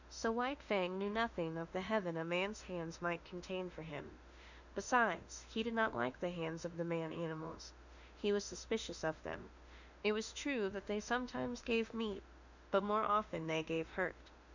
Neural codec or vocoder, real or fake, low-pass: autoencoder, 48 kHz, 32 numbers a frame, DAC-VAE, trained on Japanese speech; fake; 7.2 kHz